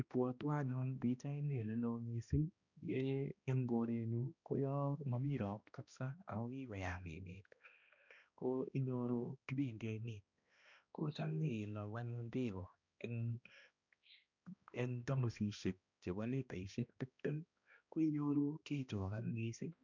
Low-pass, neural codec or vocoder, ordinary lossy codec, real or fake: 7.2 kHz; codec, 16 kHz, 1 kbps, X-Codec, HuBERT features, trained on balanced general audio; none; fake